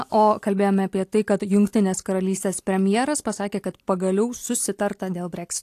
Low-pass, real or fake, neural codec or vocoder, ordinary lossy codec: 14.4 kHz; real; none; AAC, 64 kbps